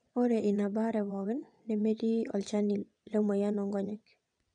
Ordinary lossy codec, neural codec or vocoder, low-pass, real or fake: none; vocoder, 22.05 kHz, 80 mel bands, WaveNeXt; 9.9 kHz; fake